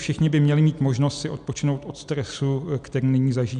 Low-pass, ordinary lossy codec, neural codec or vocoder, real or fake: 9.9 kHz; MP3, 96 kbps; none; real